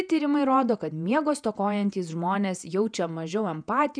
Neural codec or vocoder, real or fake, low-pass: vocoder, 48 kHz, 128 mel bands, Vocos; fake; 9.9 kHz